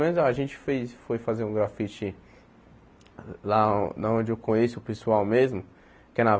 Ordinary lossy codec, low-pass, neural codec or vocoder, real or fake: none; none; none; real